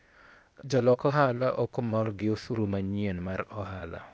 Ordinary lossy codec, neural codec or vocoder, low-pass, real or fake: none; codec, 16 kHz, 0.8 kbps, ZipCodec; none; fake